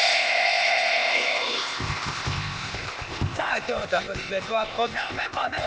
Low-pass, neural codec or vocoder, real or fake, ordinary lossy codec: none; codec, 16 kHz, 0.8 kbps, ZipCodec; fake; none